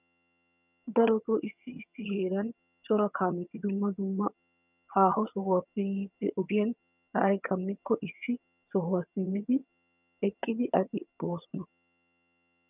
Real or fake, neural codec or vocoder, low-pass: fake; vocoder, 22.05 kHz, 80 mel bands, HiFi-GAN; 3.6 kHz